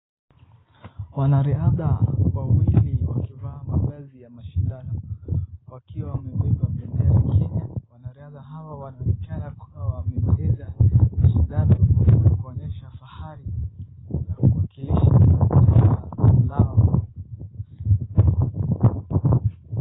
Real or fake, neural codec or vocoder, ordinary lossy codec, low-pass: real; none; AAC, 16 kbps; 7.2 kHz